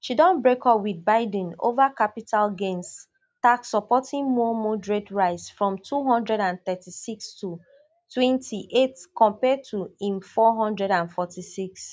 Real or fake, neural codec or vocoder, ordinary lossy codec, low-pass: real; none; none; none